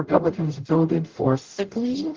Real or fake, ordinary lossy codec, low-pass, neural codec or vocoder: fake; Opus, 16 kbps; 7.2 kHz; codec, 44.1 kHz, 0.9 kbps, DAC